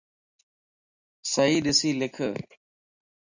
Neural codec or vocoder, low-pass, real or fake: none; 7.2 kHz; real